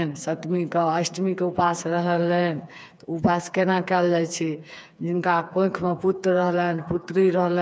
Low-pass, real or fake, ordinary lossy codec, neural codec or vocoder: none; fake; none; codec, 16 kHz, 4 kbps, FreqCodec, smaller model